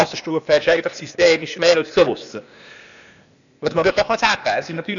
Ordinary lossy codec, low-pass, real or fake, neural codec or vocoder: none; 7.2 kHz; fake; codec, 16 kHz, 0.8 kbps, ZipCodec